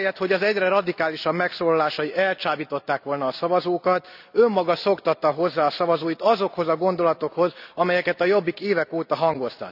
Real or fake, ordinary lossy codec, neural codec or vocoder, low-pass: real; none; none; 5.4 kHz